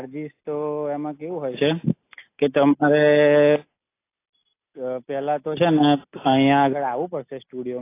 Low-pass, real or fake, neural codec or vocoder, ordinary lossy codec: 3.6 kHz; real; none; AAC, 24 kbps